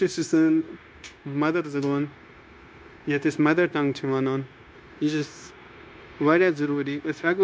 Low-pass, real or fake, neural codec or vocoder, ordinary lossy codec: none; fake; codec, 16 kHz, 0.9 kbps, LongCat-Audio-Codec; none